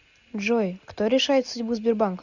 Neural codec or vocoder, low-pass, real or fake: none; 7.2 kHz; real